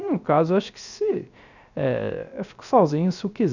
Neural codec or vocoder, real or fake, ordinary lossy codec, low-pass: codec, 16 kHz, 0.3 kbps, FocalCodec; fake; none; 7.2 kHz